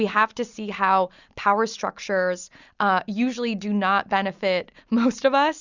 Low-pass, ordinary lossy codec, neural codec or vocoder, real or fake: 7.2 kHz; Opus, 64 kbps; none; real